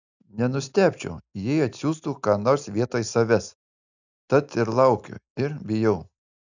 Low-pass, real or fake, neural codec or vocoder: 7.2 kHz; real; none